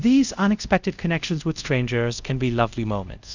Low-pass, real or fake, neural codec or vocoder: 7.2 kHz; fake; codec, 24 kHz, 0.5 kbps, DualCodec